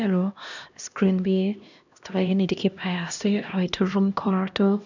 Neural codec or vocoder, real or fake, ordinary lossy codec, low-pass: codec, 16 kHz, 1 kbps, X-Codec, HuBERT features, trained on LibriSpeech; fake; none; 7.2 kHz